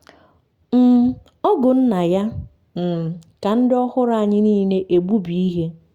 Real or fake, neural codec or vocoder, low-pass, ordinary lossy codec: real; none; 19.8 kHz; Opus, 64 kbps